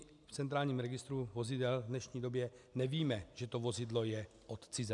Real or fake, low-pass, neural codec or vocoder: real; 10.8 kHz; none